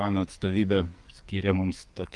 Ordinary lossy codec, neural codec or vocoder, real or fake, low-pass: Opus, 32 kbps; codec, 44.1 kHz, 2.6 kbps, SNAC; fake; 10.8 kHz